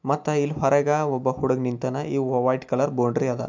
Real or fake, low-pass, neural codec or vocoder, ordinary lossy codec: real; 7.2 kHz; none; MP3, 64 kbps